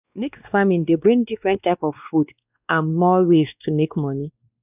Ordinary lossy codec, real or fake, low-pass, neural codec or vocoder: none; fake; 3.6 kHz; codec, 16 kHz, 2 kbps, X-Codec, WavLM features, trained on Multilingual LibriSpeech